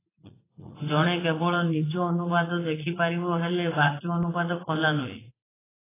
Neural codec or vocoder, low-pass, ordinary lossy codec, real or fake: vocoder, 44.1 kHz, 80 mel bands, Vocos; 3.6 kHz; AAC, 16 kbps; fake